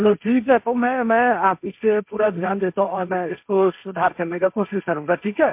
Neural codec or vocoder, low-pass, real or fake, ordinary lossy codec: codec, 16 kHz, 1.1 kbps, Voila-Tokenizer; 3.6 kHz; fake; MP3, 32 kbps